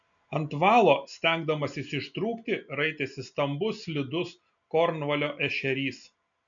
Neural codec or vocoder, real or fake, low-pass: none; real; 7.2 kHz